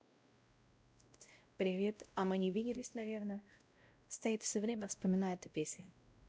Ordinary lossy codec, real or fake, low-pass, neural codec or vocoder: none; fake; none; codec, 16 kHz, 0.5 kbps, X-Codec, WavLM features, trained on Multilingual LibriSpeech